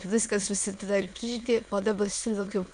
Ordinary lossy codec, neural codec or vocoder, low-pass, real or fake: AAC, 64 kbps; autoencoder, 22.05 kHz, a latent of 192 numbers a frame, VITS, trained on many speakers; 9.9 kHz; fake